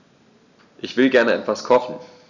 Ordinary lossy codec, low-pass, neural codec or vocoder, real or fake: none; 7.2 kHz; none; real